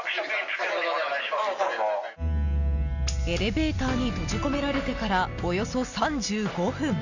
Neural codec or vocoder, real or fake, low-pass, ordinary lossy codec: none; real; 7.2 kHz; none